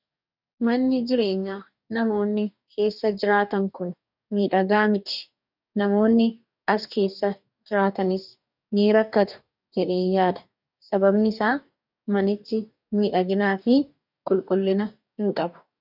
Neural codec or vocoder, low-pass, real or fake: codec, 44.1 kHz, 2.6 kbps, DAC; 5.4 kHz; fake